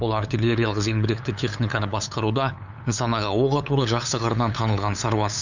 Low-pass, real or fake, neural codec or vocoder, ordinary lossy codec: 7.2 kHz; fake; codec, 16 kHz, 8 kbps, FunCodec, trained on LibriTTS, 25 frames a second; none